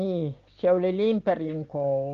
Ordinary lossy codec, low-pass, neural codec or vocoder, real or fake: Opus, 32 kbps; 7.2 kHz; none; real